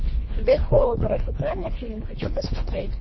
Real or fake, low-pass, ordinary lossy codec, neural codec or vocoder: fake; 7.2 kHz; MP3, 24 kbps; codec, 24 kHz, 1.5 kbps, HILCodec